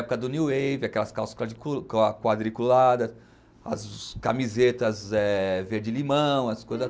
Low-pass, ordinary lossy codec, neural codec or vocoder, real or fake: none; none; none; real